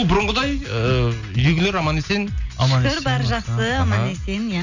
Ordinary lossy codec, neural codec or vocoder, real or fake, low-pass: none; none; real; 7.2 kHz